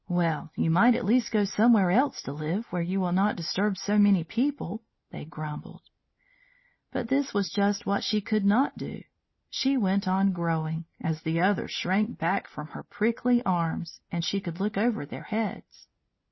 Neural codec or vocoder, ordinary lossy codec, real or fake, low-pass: none; MP3, 24 kbps; real; 7.2 kHz